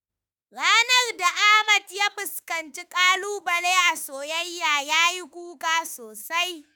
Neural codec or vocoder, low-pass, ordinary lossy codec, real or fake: autoencoder, 48 kHz, 32 numbers a frame, DAC-VAE, trained on Japanese speech; none; none; fake